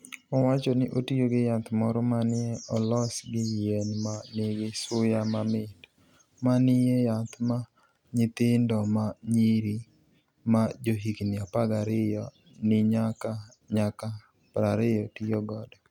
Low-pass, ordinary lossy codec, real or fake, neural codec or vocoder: 19.8 kHz; none; real; none